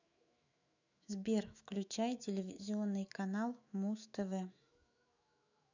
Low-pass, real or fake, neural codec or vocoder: 7.2 kHz; fake; autoencoder, 48 kHz, 128 numbers a frame, DAC-VAE, trained on Japanese speech